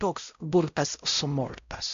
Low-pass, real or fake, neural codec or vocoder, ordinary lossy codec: 7.2 kHz; fake; codec, 16 kHz, 0.5 kbps, X-Codec, WavLM features, trained on Multilingual LibriSpeech; AAC, 64 kbps